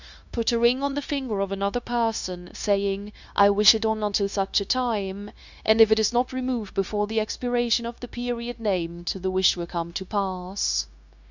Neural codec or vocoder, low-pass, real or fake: codec, 16 kHz, 0.9 kbps, LongCat-Audio-Codec; 7.2 kHz; fake